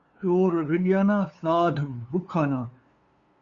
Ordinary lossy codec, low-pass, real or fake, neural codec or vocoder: Opus, 64 kbps; 7.2 kHz; fake; codec, 16 kHz, 2 kbps, FunCodec, trained on LibriTTS, 25 frames a second